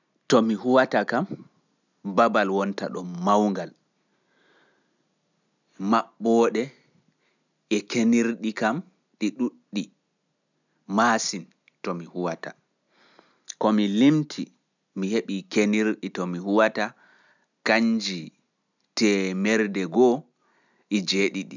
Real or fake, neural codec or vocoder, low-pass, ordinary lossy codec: real; none; 7.2 kHz; none